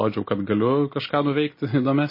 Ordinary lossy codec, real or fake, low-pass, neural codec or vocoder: MP3, 24 kbps; real; 5.4 kHz; none